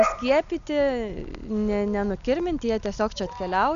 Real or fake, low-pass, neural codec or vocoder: real; 7.2 kHz; none